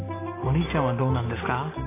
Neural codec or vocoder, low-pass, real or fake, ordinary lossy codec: none; 3.6 kHz; real; AAC, 16 kbps